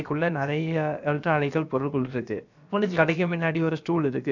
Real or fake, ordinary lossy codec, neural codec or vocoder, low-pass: fake; none; codec, 16 kHz, about 1 kbps, DyCAST, with the encoder's durations; 7.2 kHz